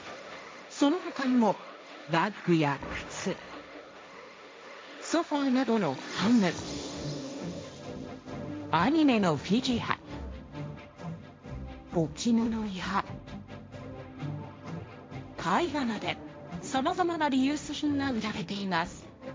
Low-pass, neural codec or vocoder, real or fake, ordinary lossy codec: none; codec, 16 kHz, 1.1 kbps, Voila-Tokenizer; fake; none